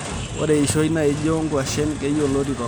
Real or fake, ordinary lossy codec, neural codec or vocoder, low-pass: real; none; none; none